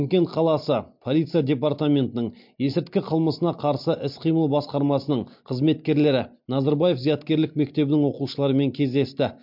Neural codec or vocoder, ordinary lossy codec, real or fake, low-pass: none; MP3, 48 kbps; real; 5.4 kHz